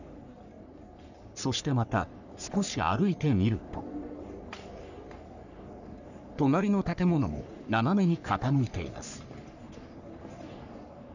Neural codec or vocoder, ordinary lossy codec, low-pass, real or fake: codec, 44.1 kHz, 3.4 kbps, Pupu-Codec; none; 7.2 kHz; fake